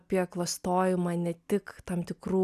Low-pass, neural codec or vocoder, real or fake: 14.4 kHz; none; real